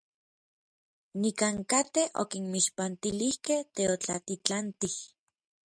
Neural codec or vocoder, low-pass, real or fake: none; 9.9 kHz; real